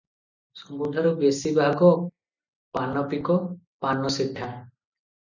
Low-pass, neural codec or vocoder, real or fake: 7.2 kHz; none; real